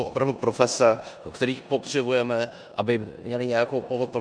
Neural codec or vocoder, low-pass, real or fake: codec, 16 kHz in and 24 kHz out, 0.9 kbps, LongCat-Audio-Codec, four codebook decoder; 9.9 kHz; fake